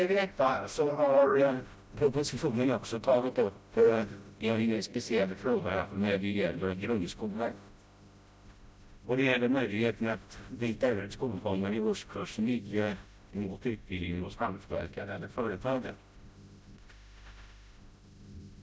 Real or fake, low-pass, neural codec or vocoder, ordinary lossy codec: fake; none; codec, 16 kHz, 0.5 kbps, FreqCodec, smaller model; none